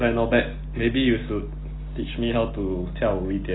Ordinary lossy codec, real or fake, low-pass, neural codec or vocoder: AAC, 16 kbps; real; 7.2 kHz; none